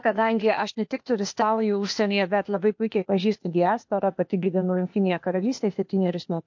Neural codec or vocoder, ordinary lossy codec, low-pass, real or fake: codec, 16 kHz, 0.8 kbps, ZipCodec; MP3, 48 kbps; 7.2 kHz; fake